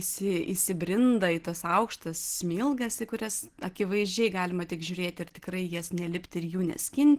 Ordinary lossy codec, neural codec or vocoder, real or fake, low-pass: Opus, 16 kbps; none; real; 14.4 kHz